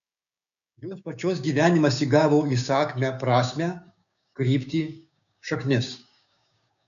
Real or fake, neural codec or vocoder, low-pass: fake; codec, 16 kHz, 6 kbps, DAC; 7.2 kHz